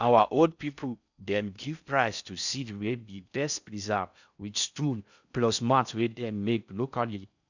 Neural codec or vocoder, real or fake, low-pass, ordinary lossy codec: codec, 16 kHz in and 24 kHz out, 0.6 kbps, FocalCodec, streaming, 4096 codes; fake; 7.2 kHz; none